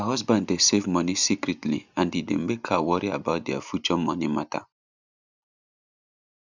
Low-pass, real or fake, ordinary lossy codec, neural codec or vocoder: 7.2 kHz; real; none; none